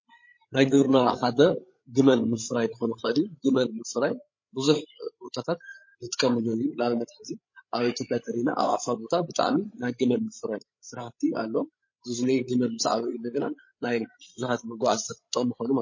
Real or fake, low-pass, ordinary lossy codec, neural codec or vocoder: fake; 7.2 kHz; MP3, 32 kbps; codec, 16 kHz, 8 kbps, FreqCodec, larger model